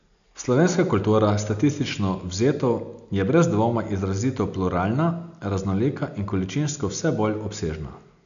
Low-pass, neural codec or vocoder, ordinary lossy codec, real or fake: 7.2 kHz; none; none; real